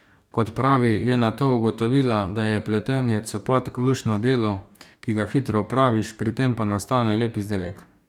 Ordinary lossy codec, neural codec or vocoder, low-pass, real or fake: none; codec, 44.1 kHz, 2.6 kbps, DAC; 19.8 kHz; fake